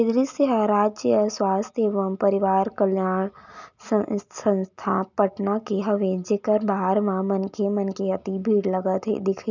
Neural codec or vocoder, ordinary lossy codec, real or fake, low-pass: none; none; real; 7.2 kHz